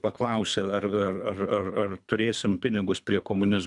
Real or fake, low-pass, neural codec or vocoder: fake; 10.8 kHz; codec, 24 kHz, 3 kbps, HILCodec